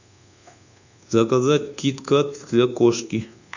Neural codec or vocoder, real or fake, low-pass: codec, 24 kHz, 1.2 kbps, DualCodec; fake; 7.2 kHz